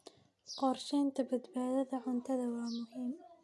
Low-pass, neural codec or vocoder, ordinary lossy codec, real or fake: none; none; none; real